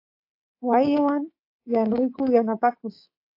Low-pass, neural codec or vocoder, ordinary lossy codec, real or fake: 5.4 kHz; codec, 24 kHz, 3.1 kbps, DualCodec; AAC, 32 kbps; fake